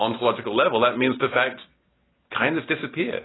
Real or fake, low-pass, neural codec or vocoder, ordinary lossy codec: real; 7.2 kHz; none; AAC, 16 kbps